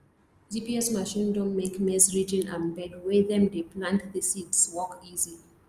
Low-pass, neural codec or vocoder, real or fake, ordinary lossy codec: 14.4 kHz; none; real; Opus, 32 kbps